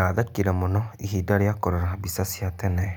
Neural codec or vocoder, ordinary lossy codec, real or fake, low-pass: none; none; real; none